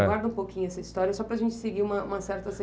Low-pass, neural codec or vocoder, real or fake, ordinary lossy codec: none; none; real; none